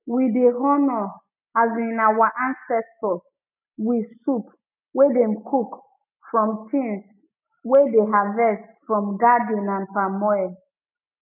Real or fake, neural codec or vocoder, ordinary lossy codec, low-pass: real; none; none; 3.6 kHz